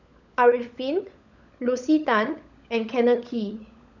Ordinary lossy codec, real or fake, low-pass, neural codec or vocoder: none; fake; 7.2 kHz; codec, 16 kHz, 16 kbps, FunCodec, trained on LibriTTS, 50 frames a second